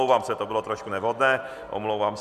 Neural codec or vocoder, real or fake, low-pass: none; real; 14.4 kHz